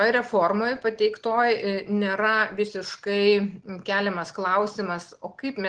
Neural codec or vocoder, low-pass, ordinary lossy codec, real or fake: none; 9.9 kHz; Opus, 16 kbps; real